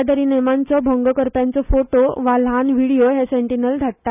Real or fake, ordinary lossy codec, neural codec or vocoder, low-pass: real; none; none; 3.6 kHz